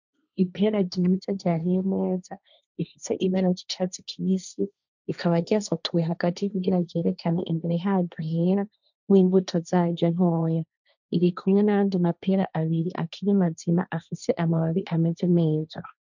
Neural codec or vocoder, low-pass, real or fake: codec, 16 kHz, 1.1 kbps, Voila-Tokenizer; 7.2 kHz; fake